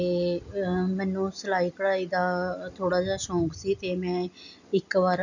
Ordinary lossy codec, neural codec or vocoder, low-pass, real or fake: none; none; 7.2 kHz; real